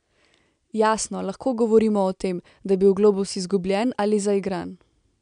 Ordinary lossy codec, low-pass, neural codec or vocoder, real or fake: none; 9.9 kHz; none; real